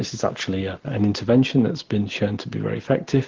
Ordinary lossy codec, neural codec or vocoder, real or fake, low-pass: Opus, 16 kbps; none; real; 7.2 kHz